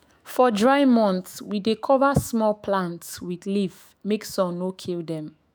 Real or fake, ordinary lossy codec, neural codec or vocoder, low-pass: fake; none; autoencoder, 48 kHz, 128 numbers a frame, DAC-VAE, trained on Japanese speech; none